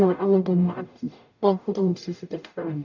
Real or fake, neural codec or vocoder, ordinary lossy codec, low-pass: fake; codec, 44.1 kHz, 0.9 kbps, DAC; none; 7.2 kHz